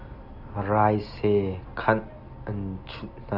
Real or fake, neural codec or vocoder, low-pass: real; none; 5.4 kHz